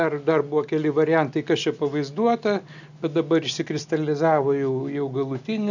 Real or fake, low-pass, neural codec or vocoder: real; 7.2 kHz; none